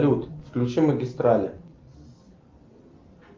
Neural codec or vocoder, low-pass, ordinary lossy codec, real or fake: none; 7.2 kHz; Opus, 32 kbps; real